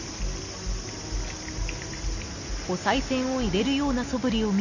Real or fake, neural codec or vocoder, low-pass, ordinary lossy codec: real; none; 7.2 kHz; none